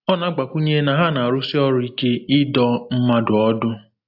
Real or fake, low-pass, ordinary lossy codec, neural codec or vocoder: real; 5.4 kHz; AAC, 48 kbps; none